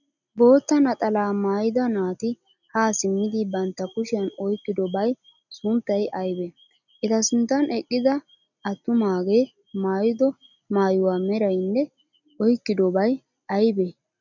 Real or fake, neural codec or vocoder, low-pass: real; none; 7.2 kHz